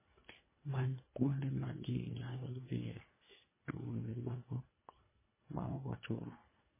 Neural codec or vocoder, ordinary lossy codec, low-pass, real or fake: codec, 24 kHz, 1.5 kbps, HILCodec; MP3, 16 kbps; 3.6 kHz; fake